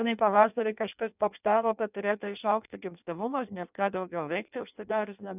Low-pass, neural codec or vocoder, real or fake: 3.6 kHz; codec, 16 kHz in and 24 kHz out, 0.6 kbps, FireRedTTS-2 codec; fake